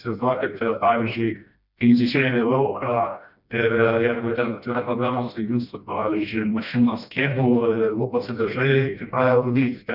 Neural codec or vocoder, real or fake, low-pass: codec, 16 kHz, 1 kbps, FreqCodec, smaller model; fake; 5.4 kHz